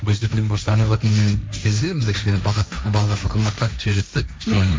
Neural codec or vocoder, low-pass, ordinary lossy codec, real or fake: codec, 16 kHz, 1.1 kbps, Voila-Tokenizer; none; none; fake